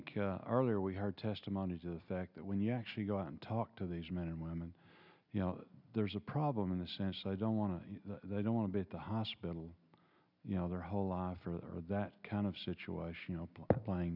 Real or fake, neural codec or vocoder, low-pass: real; none; 5.4 kHz